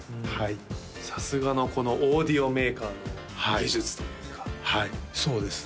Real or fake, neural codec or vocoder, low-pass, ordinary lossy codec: real; none; none; none